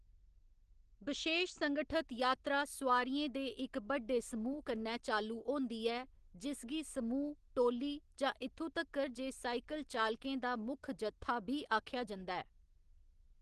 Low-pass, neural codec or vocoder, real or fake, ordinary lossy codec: 10.8 kHz; none; real; Opus, 16 kbps